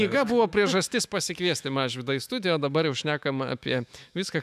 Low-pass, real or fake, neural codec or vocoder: 14.4 kHz; fake; autoencoder, 48 kHz, 128 numbers a frame, DAC-VAE, trained on Japanese speech